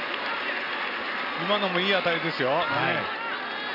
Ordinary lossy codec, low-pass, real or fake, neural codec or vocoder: none; 5.4 kHz; real; none